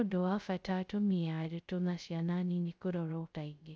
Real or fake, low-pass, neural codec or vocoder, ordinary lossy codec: fake; none; codec, 16 kHz, 0.2 kbps, FocalCodec; none